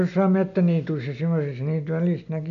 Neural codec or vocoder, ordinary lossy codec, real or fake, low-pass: none; none; real; 7.2 kHz